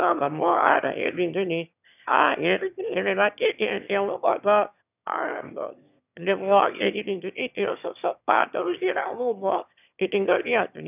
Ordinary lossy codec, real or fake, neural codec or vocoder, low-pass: none; fake; autoencoder, 22.05 kHz, a latent of 192 numbers a frame, VITS, trained on one speaker; 3.6 kHz